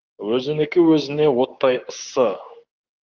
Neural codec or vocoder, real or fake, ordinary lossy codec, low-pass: codec, 16 kHz, 6 kbps, DAC; fake; Opus, 32 kbps; 7.2 kHz